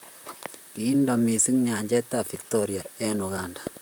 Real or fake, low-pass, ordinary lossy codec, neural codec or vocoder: fake; none; none; vocoder, 44.1 kHz, 128 mel bands, Pupu-Vocoder